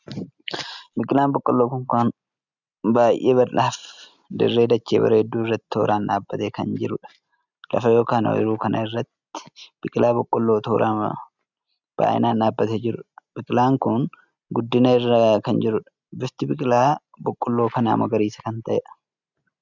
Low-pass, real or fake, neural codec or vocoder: 7.2 kHz; real; none